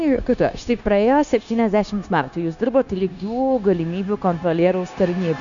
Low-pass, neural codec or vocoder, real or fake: 7.2 kHz; codec, 16 kHz, 0.9 kbps, LongCat-Audio-Codec; fake